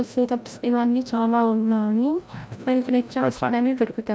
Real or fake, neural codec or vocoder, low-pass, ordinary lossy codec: fake; codec, 16 kHz, 0.5 kbps, FreqCodec, larger model; none; none